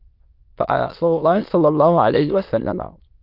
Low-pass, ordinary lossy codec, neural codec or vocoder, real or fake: 5.4 kHz; Opus, 24 kbps; autoencoder, 22.05 kHz, a latent of 192 numbers a frame, VITS, trained on many speakers; fake